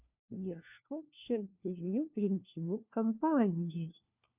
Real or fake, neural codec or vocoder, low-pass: fake; codec, 16 kHz, 1 kbps, FunCodec, trained on LibriTTS, 50 frames a second; 3.6 kHz